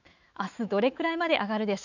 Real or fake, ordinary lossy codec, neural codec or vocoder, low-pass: fake; none; autoencoder, 48 kHz, 128 numbers a frame, DAC-VAE, trained on Japanese speech; 7.2 kHz